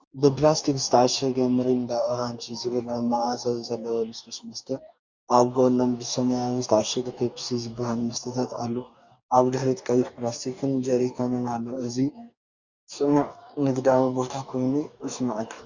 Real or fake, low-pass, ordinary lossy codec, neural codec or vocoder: fake; 7.2 kHz; Opus, 64 kbps; codec, 44.1 kHz, 2.6 kbps, DAC